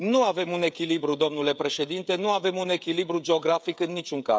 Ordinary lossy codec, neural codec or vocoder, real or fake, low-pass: none; codec, 16 kHz, 16 kbps, FreqCodec, smaller model; fake; none